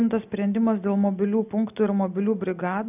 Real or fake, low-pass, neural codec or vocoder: real; 3.6 kHz; none